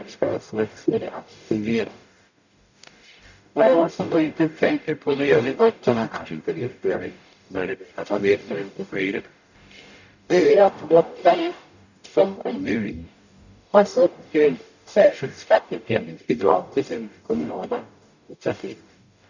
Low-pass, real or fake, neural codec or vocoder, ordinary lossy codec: 7.2 kHz; fake; codec, 44.1 kHz, 0.9 kbps, DAC; none